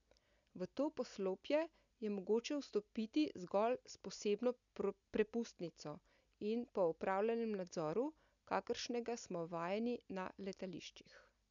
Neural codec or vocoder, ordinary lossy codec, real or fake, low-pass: none; none; real; 7.2 kHz